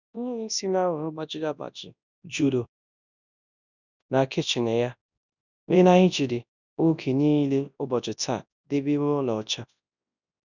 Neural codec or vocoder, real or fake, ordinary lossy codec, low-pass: codec, 24 kHz, 0.9 kbps, WavTokenizer, large speech release; fake; none; 7.2 kHz